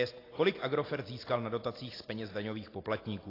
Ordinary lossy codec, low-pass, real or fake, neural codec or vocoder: AAC, 24 kbps; 5.4 kHz; real; none